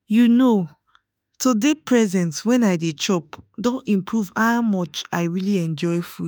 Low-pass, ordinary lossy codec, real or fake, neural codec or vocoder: none; none; fake; autoencoder, 48 kHz, 32 numbers a frame, DAC-VAE, trained on Japanese speech